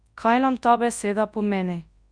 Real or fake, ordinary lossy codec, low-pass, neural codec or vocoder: fake; Opus, 64 kbps; 9.9 kHz; codec, 24 kHz, 0.9 kbps, WavTokenizer, large speech release